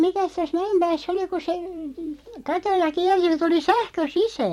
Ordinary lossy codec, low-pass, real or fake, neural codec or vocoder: MP3, 64 kbps; 19.8 kHz; fake; vocoder, 44.1 kHz, 128 mel bands every 256 samples, BigVGAN v2